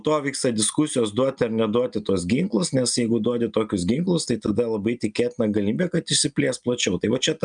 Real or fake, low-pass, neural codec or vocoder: real; 9.9 kHz; none